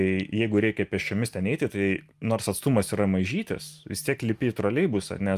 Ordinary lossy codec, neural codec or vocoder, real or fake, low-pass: Opus, 32 kbps; none; real; 14.4 kHz